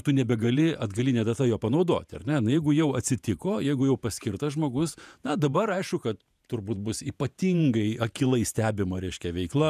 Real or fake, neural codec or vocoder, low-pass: fake; vocoder, 48 kHz, 128 mel bands, Vocos; 14.4 kHz